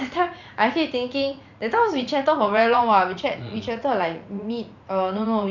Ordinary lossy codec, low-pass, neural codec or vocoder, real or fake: none; 7.2 kHz; vocoder, 44.1 kHz, 80 mel bands, Vocos; fake